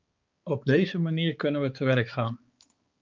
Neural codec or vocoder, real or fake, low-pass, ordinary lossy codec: codec, 16 kHz, 4 kbps, X-Codec, HuBERT features, trained on balanced general audio; fake; 7.2 kHz; Opus, 24 kbps